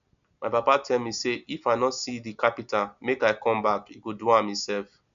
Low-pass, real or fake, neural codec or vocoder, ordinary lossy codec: 7.2 kHz; real; none; none